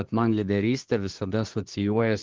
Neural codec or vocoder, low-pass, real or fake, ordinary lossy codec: codec, 24 kHz, 1 kbps, SNAC; 7.2 kHz; fake; Opus, 16 kbps